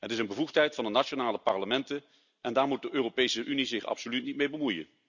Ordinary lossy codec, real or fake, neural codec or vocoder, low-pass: none; real; none; 7.2 kHz